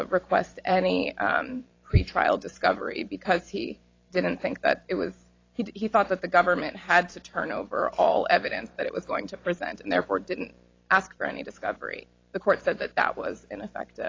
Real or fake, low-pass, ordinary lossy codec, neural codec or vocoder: fake; 7.2 kHz; AAC, 32 kbps; vocoder, 44.1 kHz, 128 mel bands every 512 samples, BigVGAN v2